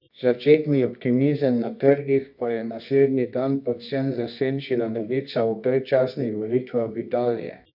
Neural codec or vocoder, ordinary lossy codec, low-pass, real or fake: codec, 24 kHz, 0.9 kbps, WavTokenizer, medium music audio release; none; 5.4 kHz; fake